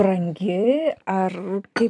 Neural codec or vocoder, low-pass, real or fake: vocoder, 44.1 kHz, 128 mel bands every 256 samples, BigVGAN v2; 10.8 kHz; fake